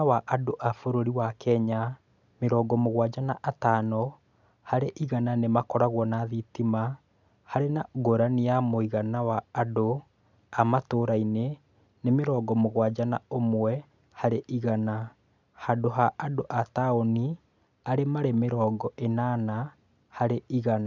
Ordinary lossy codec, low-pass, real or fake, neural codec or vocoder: none; 7.2 kHz; real; none